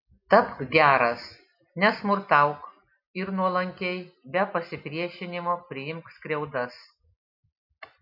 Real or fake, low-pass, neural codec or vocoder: real; 5.4 kHz; none